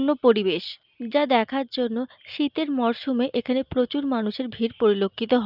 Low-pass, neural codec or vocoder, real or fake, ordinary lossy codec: 5.4 kHz; none; real; Opus, 24 kbps